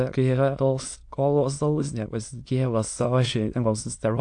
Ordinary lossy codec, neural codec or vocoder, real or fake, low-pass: AAC, 64 kbps; autoencoder, 22.05 kHz, a latent of 192 numbers a frame, VITS, trained on many speakers; fake; 9.9 kHz